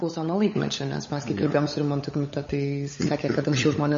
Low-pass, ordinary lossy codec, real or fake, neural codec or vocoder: 7.2 kHz; MP3, 32 kbps; fake; codec, 16 kHz, 16 kbps, FunCodec, trained on LibriTTS, 50 frames a second